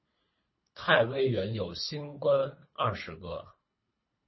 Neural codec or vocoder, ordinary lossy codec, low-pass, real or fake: codec, 24 kHz, 3 kbps, HILCodec; MP3, 24 kbps; 7.2 kHz; fake